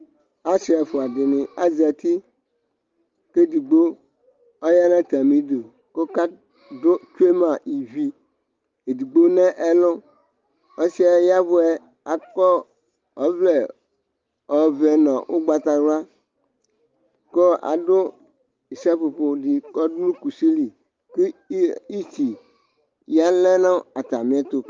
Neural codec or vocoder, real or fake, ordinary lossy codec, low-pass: none; real; Opus, 32 kbps; 7.2 kHz